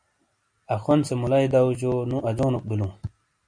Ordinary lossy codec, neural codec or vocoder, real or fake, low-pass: MP3, 48 kbps; none; real; 9.9 kHz